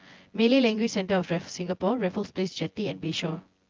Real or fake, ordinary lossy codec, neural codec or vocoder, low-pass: fake; Opus, 32 kbps; vocoder, 24 kHz, 100 mel bands, Vocos; 7.2 kHz